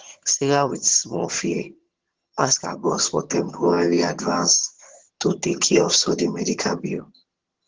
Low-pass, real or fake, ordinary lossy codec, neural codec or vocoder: 7.2 kHz; fake; Opus, 16 kbps; vocoder, 22.05 kHz, 80 mel bands, HiFi-GAN